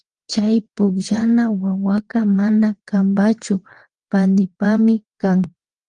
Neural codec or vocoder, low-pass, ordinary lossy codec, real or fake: vocoder, 22.05 kHz, 80 mel bands, WaveNeXt; 9.9 kHz; Opus, 24 kbps; fake